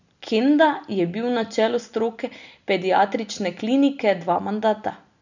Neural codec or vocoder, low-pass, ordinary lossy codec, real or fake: none; 7.2 kHz; none; real